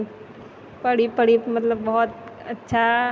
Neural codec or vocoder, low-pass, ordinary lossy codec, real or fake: none; none; none; real